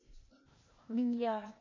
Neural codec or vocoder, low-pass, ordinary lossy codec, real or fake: codec, 16 kHz, 0.8 kbps, ZipCodec; 7.2 kHz; MP3, 32 kbps; fake